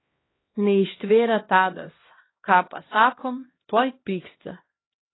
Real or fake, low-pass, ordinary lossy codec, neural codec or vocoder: fake; 7.2 kHz; AAC, 16 kbps; codec, 16 kHz, 1 kbps, X-Codec, HuBERT features, trained on LibriSpeech